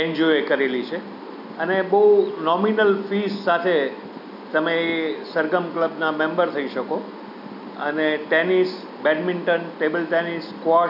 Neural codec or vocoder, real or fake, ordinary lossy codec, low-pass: none; real; none; 5.4 kHz